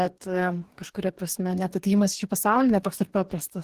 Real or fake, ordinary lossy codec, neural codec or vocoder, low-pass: fake; Opus, 16 kbps; codec, 44.1 kHz, 2.6 kbps, DAC; 14.4 kHz